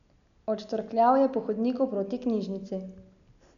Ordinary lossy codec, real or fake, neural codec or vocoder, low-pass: none; real; none; 7.2 kHz